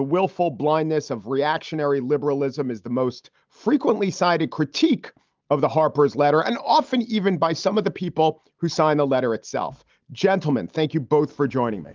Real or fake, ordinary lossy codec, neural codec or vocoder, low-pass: real; Opus, 32 kbps; none; 7.2 kHz